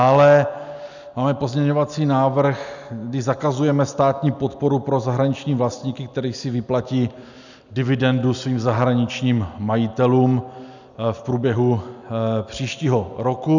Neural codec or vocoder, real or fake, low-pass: none; real; 7.2 kHz